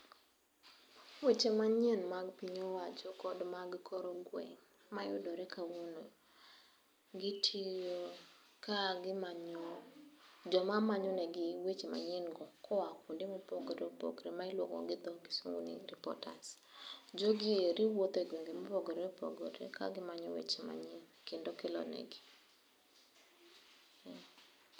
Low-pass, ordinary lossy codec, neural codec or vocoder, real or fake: none; none; none; real